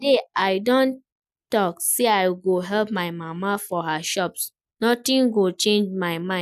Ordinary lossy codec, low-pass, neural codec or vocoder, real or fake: none; 14.4 kHz; none; real